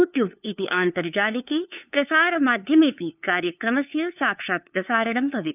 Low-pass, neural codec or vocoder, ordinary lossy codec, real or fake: 3.6 kHz; codec, 16 kHz, 2 kbps, FunCodec, trained on LibriTTS, 25 frames a second; none; fake